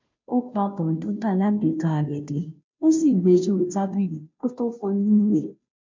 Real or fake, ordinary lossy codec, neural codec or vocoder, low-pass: fake; MP3, 48 kbps; codec, 16 kHz, 0.5 kbps, FunCodec, trained on Chinese and English, 25 frames a second; 7.2 kHz